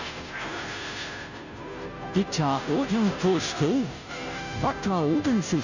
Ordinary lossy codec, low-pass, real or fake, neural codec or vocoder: none; 7.2 kHz; fake; codec, 16 kHz, 0.5 kbps, FunCodec, trained on Chinese and English, 25 frames a second